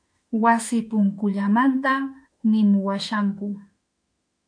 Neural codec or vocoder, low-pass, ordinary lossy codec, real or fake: autoencoder, 48 kHz, 32 numbers a frame, DAC-VAE, trained on Japanese speech; 9.9 kHz; MP3, 64 kbps; fake